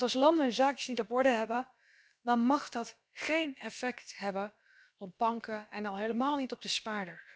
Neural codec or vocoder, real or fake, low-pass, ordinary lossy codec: codec, 16 kHz, about 1 kbps, DyCAST, with the encoder's durations; fake; none; none